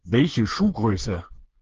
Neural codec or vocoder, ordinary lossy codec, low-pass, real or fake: codec, 16 kHz, 4 kbps, FreqCodec, smaller model; Opus, 16 kbps; 7.2 kHz; fake